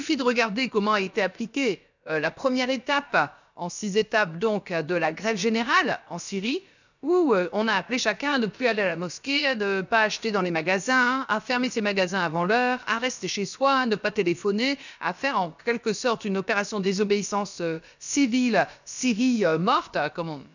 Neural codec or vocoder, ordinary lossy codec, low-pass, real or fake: codec, 16 kHz, about 1 kbps, DyCAST, with the encoder's durations; none; 7.2 kHz; fake